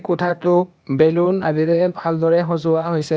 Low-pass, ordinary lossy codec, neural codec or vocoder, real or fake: none; none; codec, 16 kHz, 0.8 kbps, ZipCodec; fake